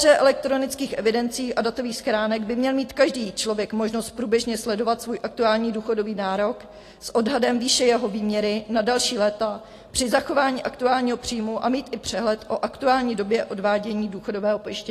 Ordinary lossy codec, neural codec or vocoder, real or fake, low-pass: AAC, 48 kbps; none; real; 14.4 kHz